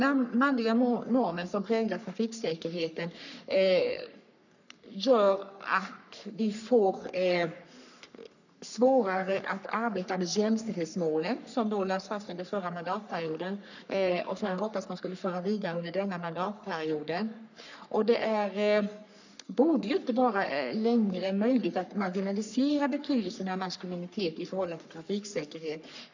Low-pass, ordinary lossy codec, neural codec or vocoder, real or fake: 7.2 kHz; none; codec, 44.1 kHz, 3.4 kbps, Pupu-Codec; fake